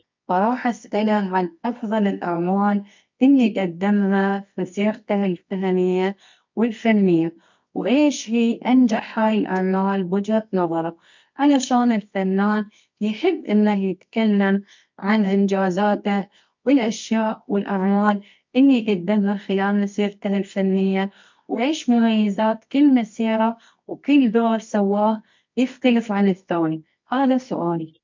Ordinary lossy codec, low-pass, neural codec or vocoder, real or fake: MP3, 48 kbps; 7.2 kHz; codec, 24 kHz, 0.9 kbps, WavTokenizer, medium music audio release; fake